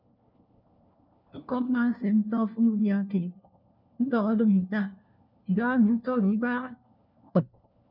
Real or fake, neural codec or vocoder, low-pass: fake; codec, 16 kHz, 1 kbps, FunCodec, trained on LibriTTS, 50 frames a second; 5.4 kHz